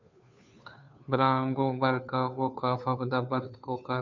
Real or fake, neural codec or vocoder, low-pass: fake; codec, 16 kHz, 2 kbps, FunCodec, trained on Chinese and English, 25 frames a second; 7.2 kHz